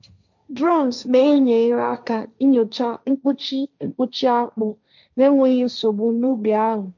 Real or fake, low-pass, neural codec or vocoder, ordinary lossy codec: fake; 7.2 kHz; codec, 16 kHz, 1.1 kbps, Voila-Tokenizer; none